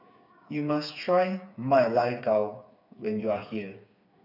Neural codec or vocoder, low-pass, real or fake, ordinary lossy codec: codec, 16 kHz, 4 kbps, FreqCodec, smaller model; 5.4 kHz; fake; none